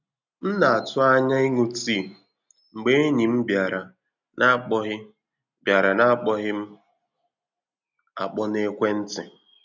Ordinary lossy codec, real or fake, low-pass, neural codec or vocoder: none; real; 7.2 kHz; none